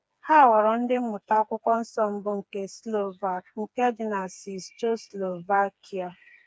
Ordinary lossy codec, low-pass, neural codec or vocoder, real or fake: none; none; codec, 16 kHz, 4 kbps, FreqCodec, smaller model; fake